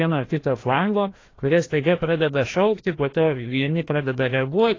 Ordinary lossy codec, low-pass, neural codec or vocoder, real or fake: AAC, 32 kbps; 7.2 kHz; codec, 16 kHz, 1 kbps, FreqCodec, larger model; fake